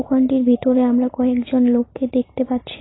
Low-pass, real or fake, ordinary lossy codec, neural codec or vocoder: 7.2 kHz; real; AAC, 16 kbps; none